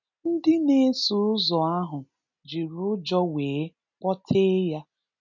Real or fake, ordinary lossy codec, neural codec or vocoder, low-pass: real; none; none; 7.2 kHz